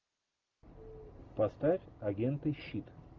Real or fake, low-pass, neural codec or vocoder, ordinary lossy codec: real; 7.2 kHz; none; Opus, 32 kbps